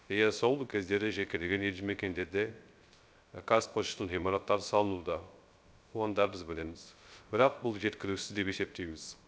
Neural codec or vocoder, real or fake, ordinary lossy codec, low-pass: codec, 16 kHz, 0.3 kbps, FocalCodec; fake; none; none